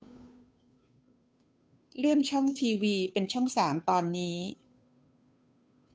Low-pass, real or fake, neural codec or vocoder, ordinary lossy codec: none; fake; codec, 16 kHz, 2 kbps, FunCodec, trained on Chinese and English, 25 frames a second; none